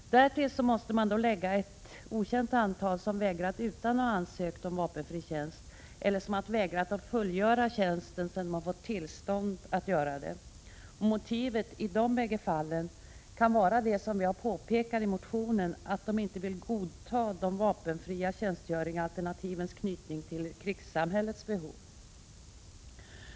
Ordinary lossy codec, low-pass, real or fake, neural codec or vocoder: none; none; real; none